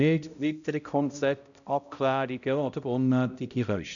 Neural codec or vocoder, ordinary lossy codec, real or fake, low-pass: codec, 16 kHz, 0.5 kbps, X-Codec, HuBERT features, trained on balanced general audio; none; fake; 7.2 kHz